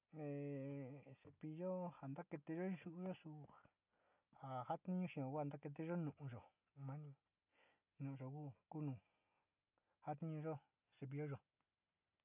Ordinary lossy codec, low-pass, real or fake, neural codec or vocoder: none; 3.6 kHz; real; none